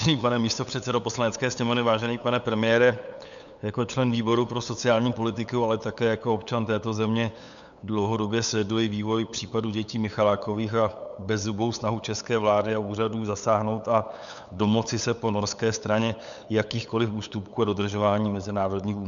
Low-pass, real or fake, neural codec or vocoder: 7.2 kHz; fake; codec, 16 kHz, 8 kbps, FunCodec, trained on LibriTTS, 25 frames a second